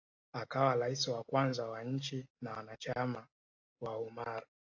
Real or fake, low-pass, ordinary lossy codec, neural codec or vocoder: real; 7.2 kHz; AAC, 48 kbps; none